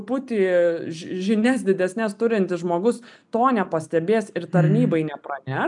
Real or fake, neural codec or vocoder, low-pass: real; none; 10.8 kHz